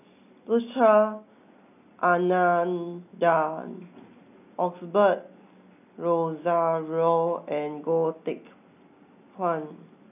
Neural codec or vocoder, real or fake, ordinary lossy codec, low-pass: none; real; none; 3.6 kHz